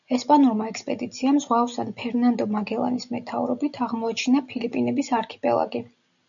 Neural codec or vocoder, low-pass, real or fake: none; 7.2 kHz; real